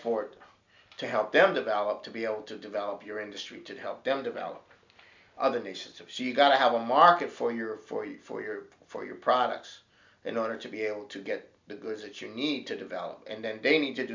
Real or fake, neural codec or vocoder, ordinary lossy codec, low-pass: real; none; MP3, 64 kbps; 7.2 kHz